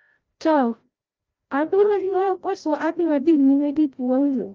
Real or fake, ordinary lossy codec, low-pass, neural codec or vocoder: fake; Opus, 24 kbps; 7.2 kHz; codec, 16 kHz, 0.5 kbps, FreqCodec, larger model